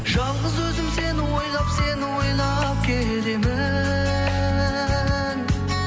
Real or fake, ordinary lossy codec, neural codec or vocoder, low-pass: real; none; none; none